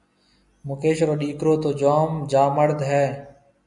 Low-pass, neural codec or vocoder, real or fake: 10.8 kHz; none; real